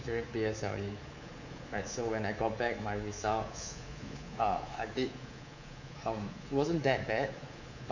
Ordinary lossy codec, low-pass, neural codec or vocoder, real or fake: none; 7.2 kHz; codec, 24 kHz, 3.1 kbps, DualCodec; fake